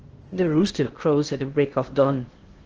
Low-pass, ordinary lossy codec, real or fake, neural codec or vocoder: 7.2 kHz; Opus, 16 kbps; fake; codec, 16 kHz in and 24 kHz out, 0.6 kbps, FocalCodec, streaming, 2048 codes